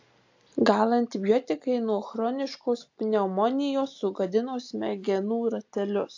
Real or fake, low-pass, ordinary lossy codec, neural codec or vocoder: real; 7.2 kHz; AAC, 48 kbps; none